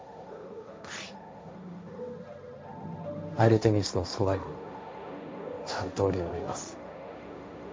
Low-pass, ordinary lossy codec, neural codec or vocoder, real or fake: none; none; codec, 16 kHz, 1.1 kbps, Voila-Tokenizer; fake